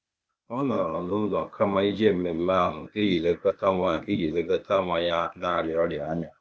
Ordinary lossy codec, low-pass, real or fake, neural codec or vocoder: none; none; fake; codec, 16 kHz, 0.8 kbps, ZipCodec